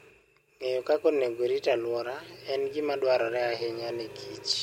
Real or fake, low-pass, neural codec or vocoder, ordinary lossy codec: real; 19.8 kHz; none; MP3, 64 kbps